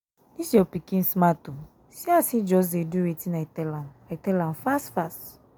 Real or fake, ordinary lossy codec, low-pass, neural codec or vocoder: real; none; none; none